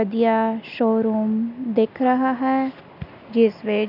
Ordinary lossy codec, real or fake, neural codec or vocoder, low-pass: none; real; none; 5.4 kHz